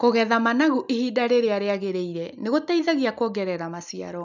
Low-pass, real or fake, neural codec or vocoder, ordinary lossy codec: 7.2 kHz; real; none; none